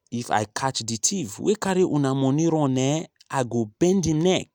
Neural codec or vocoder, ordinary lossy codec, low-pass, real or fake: none; none; none; real